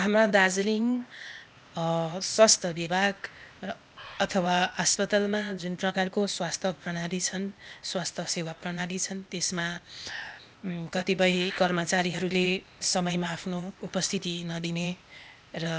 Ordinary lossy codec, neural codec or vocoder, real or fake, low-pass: none; codec, 16 kHz, 0.8 kbps, ZipCodec; fake; none